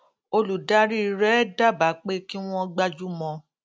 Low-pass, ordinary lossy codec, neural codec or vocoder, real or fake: none; none; none; real